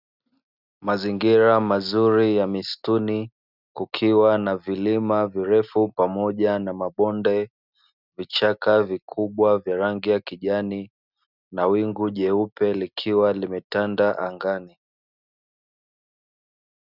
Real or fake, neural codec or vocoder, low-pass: real; none; 5.4 kHz